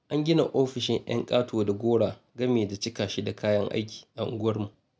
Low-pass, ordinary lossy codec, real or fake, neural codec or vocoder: none; none; real; none